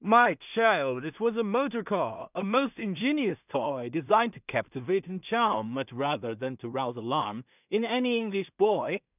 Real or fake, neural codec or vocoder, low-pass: fake; codec, 16 kHz in and 24 kHz out, 0.4 kbps, LongCat-Audio-Codec, two codebook decoder; 3.6 kHz